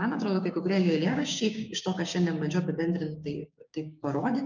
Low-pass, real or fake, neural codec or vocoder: 7.2 kHz; fake; codec, 16 kHz, 6 kbps, DAC